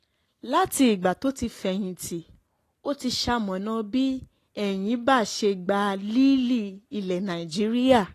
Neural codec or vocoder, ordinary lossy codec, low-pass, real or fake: none; AAC, 48 kbps; 14.4 kHz; real